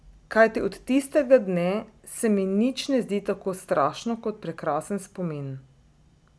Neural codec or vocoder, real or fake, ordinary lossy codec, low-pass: none; real; none; none